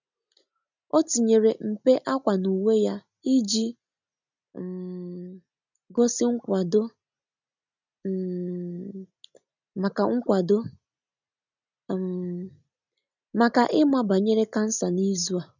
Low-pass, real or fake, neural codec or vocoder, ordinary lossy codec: 7.2 kHz; real; none; none